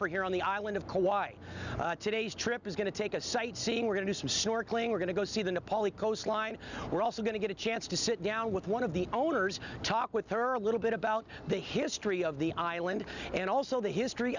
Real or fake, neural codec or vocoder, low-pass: real; none; 7.2 kHz